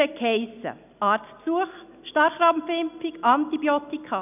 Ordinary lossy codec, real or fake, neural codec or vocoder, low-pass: none; real; none; 3.6 kHz